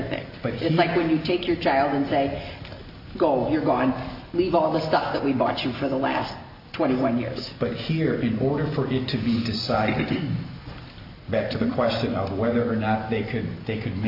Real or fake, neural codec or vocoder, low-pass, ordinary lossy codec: real; none; 5.4 kHz; AAC, 48 kbps